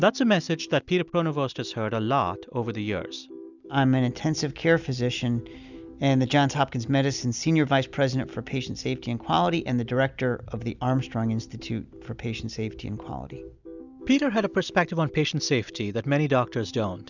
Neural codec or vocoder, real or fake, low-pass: none; real; 7.2 kHz